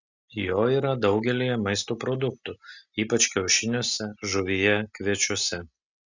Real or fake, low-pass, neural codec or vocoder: real; 7.2 kHz; none